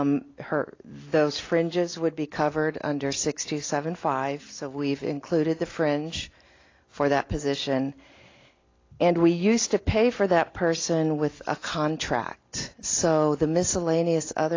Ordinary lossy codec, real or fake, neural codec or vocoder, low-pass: AAC, 32 kbps; real; none; 7.2 kHz